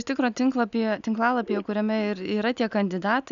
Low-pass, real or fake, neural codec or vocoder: 7.2 kHz; real; none